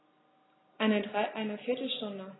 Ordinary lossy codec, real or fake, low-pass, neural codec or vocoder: AAC, 16 kbps; real; 7.2 kHz; none